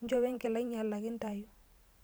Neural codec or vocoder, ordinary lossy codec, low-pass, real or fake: vocoder, 44.1 kHz, 128 mel bands every 512 samples, BigVGAN v2; none; none; fake